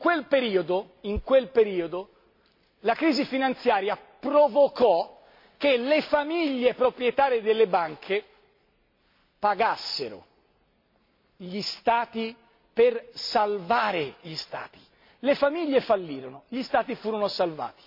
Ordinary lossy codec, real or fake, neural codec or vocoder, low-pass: MP3, 32 kbps; real; none; 5.4 kHz